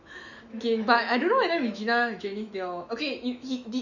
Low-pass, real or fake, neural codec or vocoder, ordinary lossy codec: 7.2 kHz; fake; autoencoder, 48 kHz, 128 numbers a frame, DAC-VAE, trained on Japanese speech; none